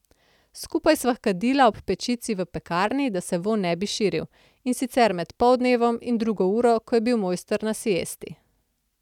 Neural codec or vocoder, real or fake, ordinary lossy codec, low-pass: none; real; none; 19.8 kHz